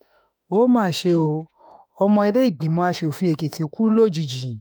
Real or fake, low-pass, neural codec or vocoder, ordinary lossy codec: fake; none; autoencoder, 48 kHz, 32 numbers a frame, DAC-VAE, trained on Japanese speech; none